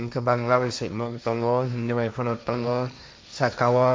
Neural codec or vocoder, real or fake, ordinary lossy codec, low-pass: codec, 16 kHz, 1.1 kbps, Voila-Tokenizer; fake; none; none